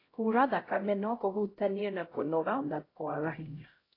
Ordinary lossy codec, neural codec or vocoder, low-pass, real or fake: AAC, 24 kbps; codec, 16 kHz, 0.5 kbps, X-Codec, HuBERT features, trained on LibriSpeech; 5.4 kHz; fake